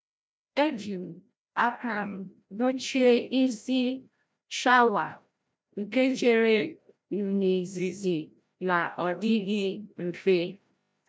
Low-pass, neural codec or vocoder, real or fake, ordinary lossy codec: none; codec, 16 kHz, 0.5 kbps, FreqCodec, larger model; fake; none